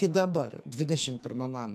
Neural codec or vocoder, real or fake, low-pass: codec, 44.1 kHz, 2.6 kbps, SNAC; fake; 14.4 kHz